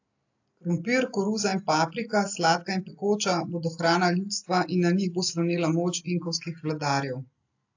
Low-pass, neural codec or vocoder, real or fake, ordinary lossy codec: 7.2 kHz; none; real; AAC, 48 kbps